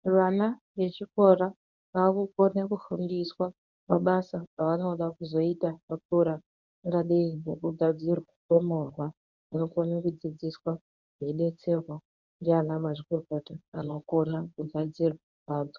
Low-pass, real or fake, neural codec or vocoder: 7.2 kHz; fake; codec, 24 kHz, 0.9 kbps, WavTokenizer, medium speech release version 1